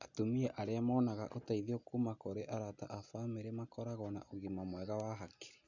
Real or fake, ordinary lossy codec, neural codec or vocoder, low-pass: real; none; none; 7.2 kHz